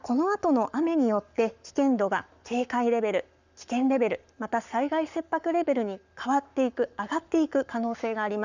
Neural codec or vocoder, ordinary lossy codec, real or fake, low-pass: codec, 44.1 kHz, 7.8 kbps, Pupu-Codec; none; fake; 7.2 kHz